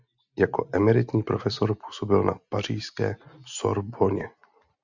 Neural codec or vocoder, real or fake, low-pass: none; real; 7.2 kHz